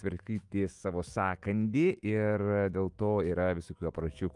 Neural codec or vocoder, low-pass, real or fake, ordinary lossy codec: autoencoder, 48 kHz, 128 numbers a frame, DAC-VAE, trained on Japanese speech; 10.8 kHz; fake; Opus, 32 kbps